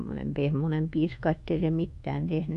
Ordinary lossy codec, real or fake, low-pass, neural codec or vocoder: MP3, 96 kbps; fake; 10.8 kHz; codec, 24 kHz, 1.2 kbps, DualCodec